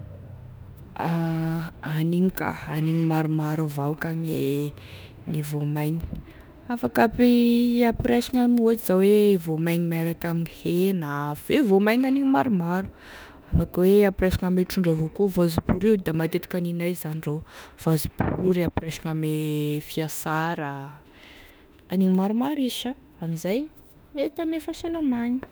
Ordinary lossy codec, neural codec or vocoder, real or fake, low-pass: none; autoencoder, 48 kHz, 32 numbers a frame, DAC-VAE, trained on Japanese speech; fake; none